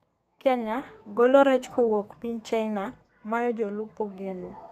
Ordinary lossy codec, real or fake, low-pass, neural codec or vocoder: none; fake; 14.4 kHz; codec, 32 kHz, 1.9 kbps, SNAC